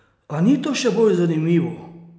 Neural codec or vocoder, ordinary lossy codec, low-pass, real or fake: none; none; none; real